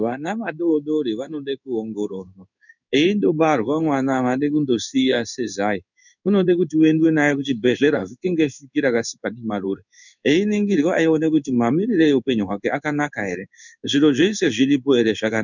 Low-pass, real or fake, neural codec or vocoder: 7.2 kHz; fake; codec, 16 kHz in and 24 kHz out, 1 kbps, XY-Tokenizer